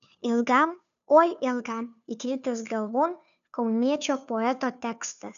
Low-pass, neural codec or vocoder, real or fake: 7.2 kHz; codec, 16 kHz, 2 kbps, FunCodec, trained on Chinese and English, 25 frames a second; fake